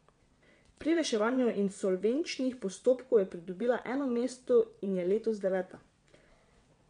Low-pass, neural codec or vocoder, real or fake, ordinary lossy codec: 9.9 kHz; vocoder, 22.05 kHz, 80 mel bands, WaveNeXt; fake; MP3, 64 kbps